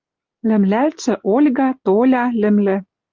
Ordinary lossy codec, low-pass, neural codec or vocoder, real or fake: Opus, 32 kbps; 7.2 kHz; none; real